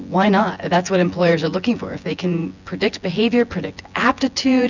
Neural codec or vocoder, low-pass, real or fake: vocoder, 24 kHz, 100 mel bands, Vocos; 7.2 kHz; fake